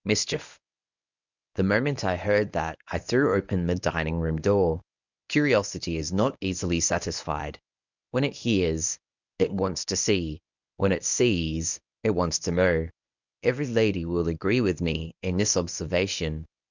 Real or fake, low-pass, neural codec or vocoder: fake; 7.2 kHz; codec, 24 kHz, 0.9 kbps, WavTokenizer, medium speech release version 1